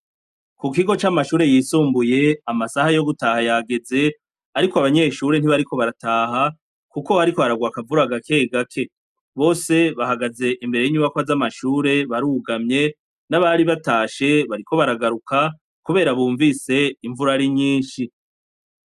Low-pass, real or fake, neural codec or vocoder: 14.4 kHz; real; none